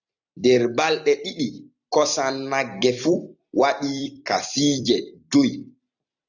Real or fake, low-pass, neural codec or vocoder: fake; 7.2 kHz; vocoder, 44.1 kHz, 128 mel bands every 256 samples, BigVGAN v2